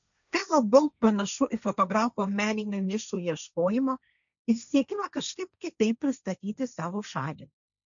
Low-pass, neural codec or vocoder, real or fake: 7.2 kHz; codec, 16 kHz, 1.1 kbps, Voila-Tokenizer; fake